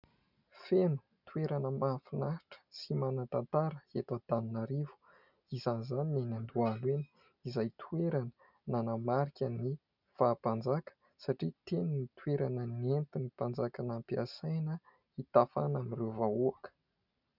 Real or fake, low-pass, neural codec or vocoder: real; 5.4 kHz; none